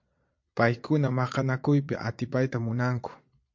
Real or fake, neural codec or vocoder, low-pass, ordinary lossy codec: fake; vocoder, 22.05 kHz, 80 mel bands, Vocos; 7.2 kHz; MP3, 48 kbps